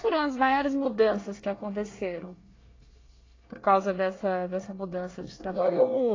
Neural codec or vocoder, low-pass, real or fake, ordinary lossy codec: codec, 24 kHz, 1 kbps, SNAC; 7.2 kHz; fake; AAC, 32 kbps